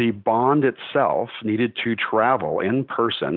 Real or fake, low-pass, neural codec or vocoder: real; 5.4 kHz; none